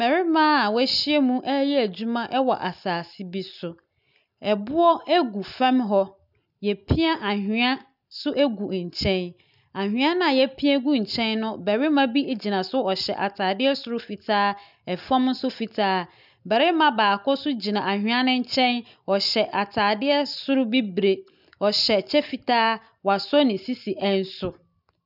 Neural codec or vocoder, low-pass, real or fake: none; 5.4 kHz; real